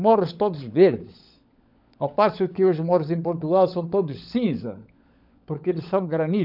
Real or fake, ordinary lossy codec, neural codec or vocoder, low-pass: fake; none; codec, 16 kHz, 16 kbps, FunCodec, trained on LibriTTS, 50 frames a second; 5.4 kHz